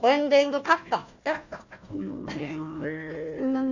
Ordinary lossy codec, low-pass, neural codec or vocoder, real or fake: none; 7.2 kHz; codec, 16 kHz, 1 kbps, FunCodec, trained on Chinese and English, 50 frames a second; fake